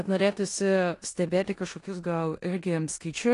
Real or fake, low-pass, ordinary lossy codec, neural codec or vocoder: fake; 10.8 kHz; AAC, 48 kbps; codec, 16 kHz in and 24 kHz out, 0.9 kbps, LongCat-Audio-Codec, four codebook decoder